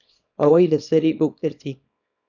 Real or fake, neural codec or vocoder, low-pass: fake; codec, 24 kHz, 0.9 kbps, WavTokenizer, small release; 7.2 kHz